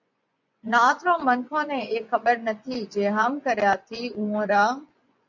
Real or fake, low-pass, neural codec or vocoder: fake; 7.2 kHz; vocoder, 24 kHz, 100 mel bands, Vocos